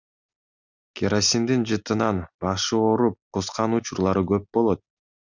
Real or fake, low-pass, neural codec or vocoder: real; 7.2 kHz; none